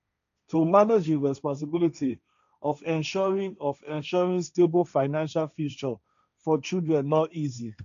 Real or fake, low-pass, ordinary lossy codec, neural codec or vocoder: fake; 7.2 kHz; none; codec, 16 kHz, 1.1 kbps, Voila-Tokenizer